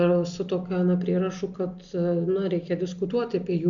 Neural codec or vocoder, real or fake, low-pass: none; real; 7.2 kHz